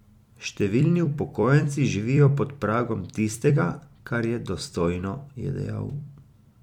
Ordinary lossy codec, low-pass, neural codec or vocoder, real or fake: MP3, 96 kbps; 19.8 kHz; vocoder, 44.1 kHz, 128 mel bands every 256 samples, BigVGAN v2; fake